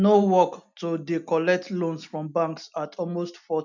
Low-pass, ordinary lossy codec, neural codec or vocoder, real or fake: 7.2 kHz; none; none; real